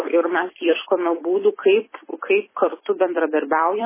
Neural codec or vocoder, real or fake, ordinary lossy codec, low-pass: vocoder, 44.1 kHz, 128 mel bands every 256 samples, BigVGAN v2; fake; MP3, 16 kbps; 3.6 kHz